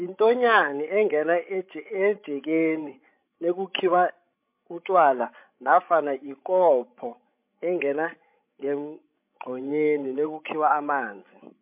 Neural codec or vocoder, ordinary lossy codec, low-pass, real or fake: codec, 16 kHz, 16 kbps, FreqCodec, larger model; MP3, 32 kbps; 3.6 kHz; fake